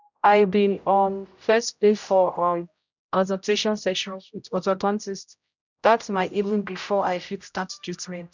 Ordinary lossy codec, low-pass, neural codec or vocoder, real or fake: none; 7.2 kHz; codec, 16 kHz, 0.5 kbps, X-Codec, HuBERT features, trained on general audio; fake